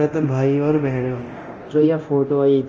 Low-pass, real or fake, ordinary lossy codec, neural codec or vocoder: 7.2 kHz; fake; Opus, 24 kbps; codec, 24 kHz, 0.9 kbps, DualCodec